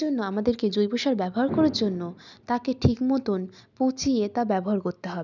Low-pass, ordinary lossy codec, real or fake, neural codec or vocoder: 7.2 kHz; none; real; none